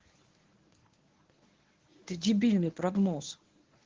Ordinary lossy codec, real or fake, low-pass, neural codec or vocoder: Opus, 16 kbps; fake; 7.2 kHz; codec, 24 kHz, 0.9 kbps, WavTokenizer, medium speech release version 1